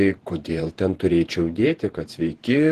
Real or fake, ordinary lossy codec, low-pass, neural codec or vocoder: fake; Opus, 16 kbps; 14.4 kHz; vocoder, 48 kHz, 128 mel bands, Vocos